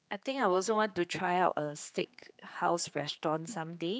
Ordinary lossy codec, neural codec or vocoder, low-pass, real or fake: none; codec, 16 kHz, 4 kbps, X-Codec, HuBERT features, trained on general audio; none; fake